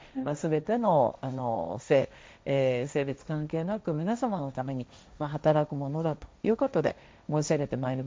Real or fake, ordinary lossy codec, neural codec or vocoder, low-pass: fake; none; codec, 16 kHz, 1.1 kbps, Voila-Tokenizer; none